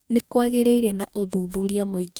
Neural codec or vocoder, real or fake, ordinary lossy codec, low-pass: codec, 44.1 kHz, 2.6 kbps, DAC; fake; none; none